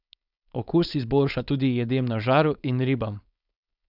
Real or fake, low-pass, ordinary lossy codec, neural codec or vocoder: fake; 5.4 kHz; none; codec, 16 kHz, 4.8 kbps, FACodec